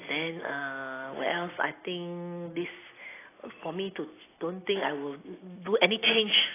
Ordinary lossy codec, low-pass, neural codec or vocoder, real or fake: AAC, 16 kbps; 3.6 kHz; none; real